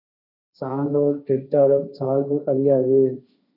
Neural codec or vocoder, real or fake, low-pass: codec, 16 kHz, 1.1 kbps, Voila-Tokenizer; fake; 5.4 kHz